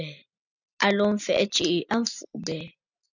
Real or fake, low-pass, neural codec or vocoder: real; 7.2 kHz; none